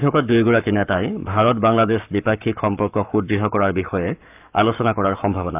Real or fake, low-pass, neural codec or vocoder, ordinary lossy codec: fake; 3.6 kHz; codec, 44.1 kHz, 7.8 kbps, Pupu-Codec; AAC, 32 kbps